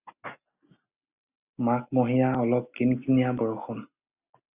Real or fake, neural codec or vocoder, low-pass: real; none; 3.6 kHz